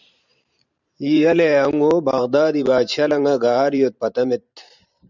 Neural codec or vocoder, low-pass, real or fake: vocoder, 44.1 kHz, 128 mel bands every 512 samples, BigVGAN v2; 7.2 kHz; fake